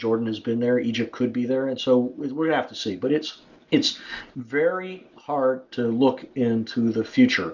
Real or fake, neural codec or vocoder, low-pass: real; none; 7.2 kHz